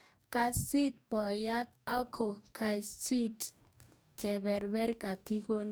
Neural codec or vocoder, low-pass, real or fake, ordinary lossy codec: codec, 44.1 kHz, 2.6 kbps, DAC; none; fake; none